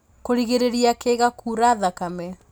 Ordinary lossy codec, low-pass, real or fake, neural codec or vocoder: none; none; real; none